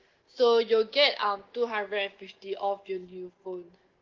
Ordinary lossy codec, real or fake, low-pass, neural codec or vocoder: Opus, 16 kbps; real; 7.2 kHz; none